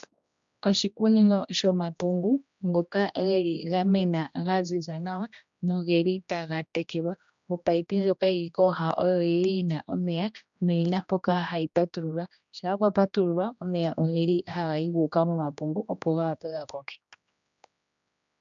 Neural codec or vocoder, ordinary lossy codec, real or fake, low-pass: codec, 16 kHz, 1 kbps, X-Codec, HuBERT features, trained on general audio; MP3, 64 kbps; fake; 7.2 kHz